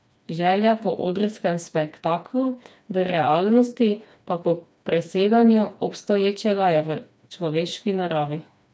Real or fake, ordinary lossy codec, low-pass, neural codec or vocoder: fake; none; none; codec, 16 kHz, 2 kbps, FreqCodec, smaller model